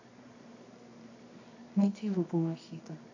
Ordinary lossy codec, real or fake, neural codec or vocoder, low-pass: AAC, 48 kbps; fake; codec, 24 kHz, 0.9 kbps, WavTokenizer, medium music audio release; 7.2 kHz